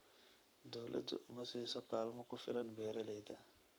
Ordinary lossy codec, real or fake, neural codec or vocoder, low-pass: none; fake; codec, 44.1 kHz, 7.8 kbps, Pupu-Codec; none